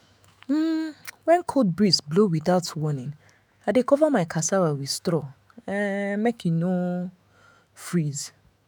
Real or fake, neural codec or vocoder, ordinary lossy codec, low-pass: fake; autoencoder, 48 kHz, 128 numbers a frame, DAC-VAE, trained on Japanese speech; none; none